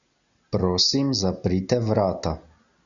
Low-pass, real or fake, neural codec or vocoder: 7.2 kHz; real; none